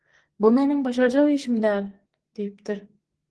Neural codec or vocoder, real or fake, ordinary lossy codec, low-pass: codec, 44.1 kHz, 2.6 kbps, SNAC; fake; Opus, 16 kbps; 10.8 kHz